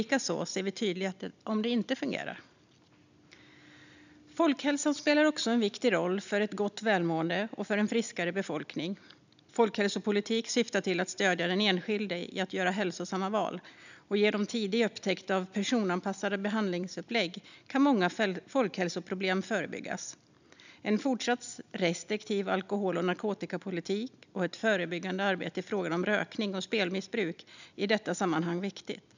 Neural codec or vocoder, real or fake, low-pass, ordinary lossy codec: none; real; 7.2 kHz; none